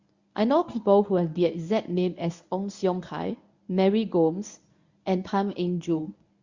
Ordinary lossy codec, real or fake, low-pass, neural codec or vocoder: Opus, 64 kbps; fake; 7.2 kHz; codec, 24 kHz, 0.9 kbps, WavTokenizer, medium speech release version 1